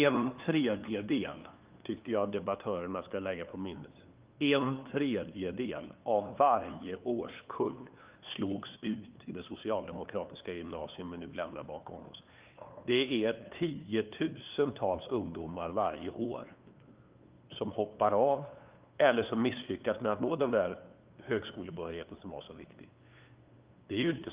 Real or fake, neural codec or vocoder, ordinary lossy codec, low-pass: fake; codec, 16 kHz, 2 kbps, FunCodec, trained on LibriTTS, 25 frames a second; Opus, 32 kbps; 3.6 kHz